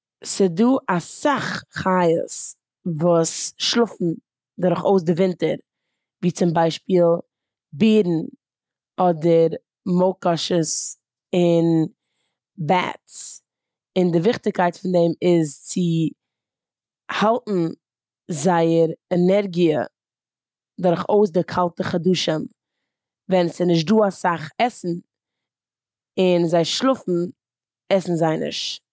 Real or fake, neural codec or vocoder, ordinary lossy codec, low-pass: real; none; none; none